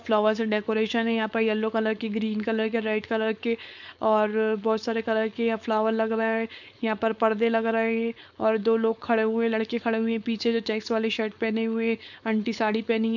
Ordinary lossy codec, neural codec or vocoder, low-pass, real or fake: none; codec, 16 kHz, 4.8 kbps, FACodec; 7.2 kHz; fake